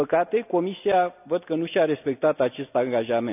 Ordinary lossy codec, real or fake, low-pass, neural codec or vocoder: none; real; 3.6 kHz; none